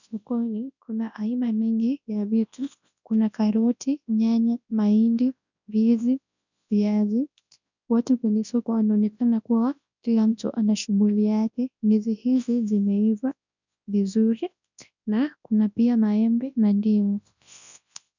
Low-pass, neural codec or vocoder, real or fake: 7.2 kHz; codec, 24 kHz, 0.9 kbps, WavTokenizer, large speech release; fake